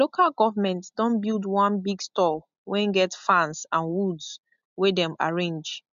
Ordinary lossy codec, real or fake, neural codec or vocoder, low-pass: MP3, 64 kbps; real; none; 7.2 kHz